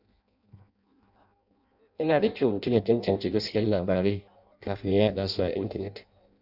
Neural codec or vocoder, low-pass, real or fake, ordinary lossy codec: codec, 16 kHz in and 24 kHz out, 0.6 kbps, FireRedTTS-2 codec; 5.4 kHz; fake; none